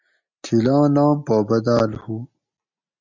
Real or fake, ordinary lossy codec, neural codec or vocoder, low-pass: real; MP3, 64 kbps; none; 7.2 kHz